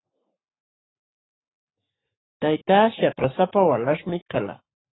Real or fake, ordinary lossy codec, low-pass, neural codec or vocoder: fake; AAC, 16 kbps; 7.2 kHz; codec, 44.1 kHz, 7.8 kbps, Pupu-Codec